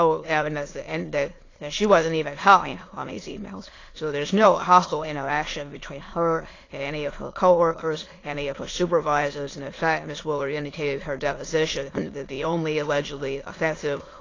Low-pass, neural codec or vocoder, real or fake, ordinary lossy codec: 7.2 kHz; autoencoder, 22.05 kHz, a latent of 192 numbers a frame, VITS, trained on many speakers; fake; AAC, 32 kbps